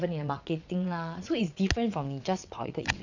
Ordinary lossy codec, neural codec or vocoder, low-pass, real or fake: none; vocoder, 22.05 kHz, 80 mel bands, WaveNeXt; 7.2 kHz; fake